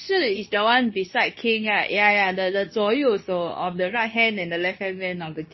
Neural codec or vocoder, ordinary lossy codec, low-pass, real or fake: codec, 24 kHz, 0.9 kbps, WavTokenizer, medium speech release version 2; MP3, 24 kbps; 7.2 kHz; fake